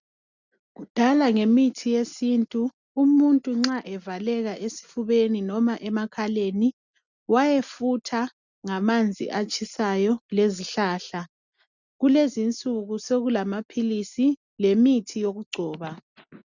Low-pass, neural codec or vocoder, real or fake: 7.2 kHz; none; real